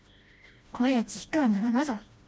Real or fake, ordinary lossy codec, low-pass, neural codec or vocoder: fake; none; none; codec, 16 kHz, 1 kbps, FreqCodec, smaller model